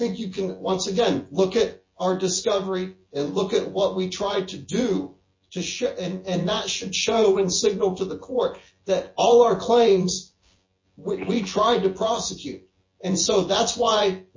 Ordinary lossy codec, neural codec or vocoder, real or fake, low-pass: MP3, 32 kbps; vocoder, 24 kHz, 100 mel bands, Vocos; fake; 7.2 kHz